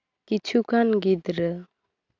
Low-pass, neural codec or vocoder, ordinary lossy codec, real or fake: 7.2 kHz; none; AAC, 48 kbps; real